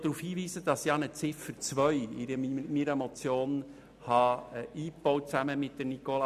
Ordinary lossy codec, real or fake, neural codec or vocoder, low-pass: none; real; none; 14.4 kHz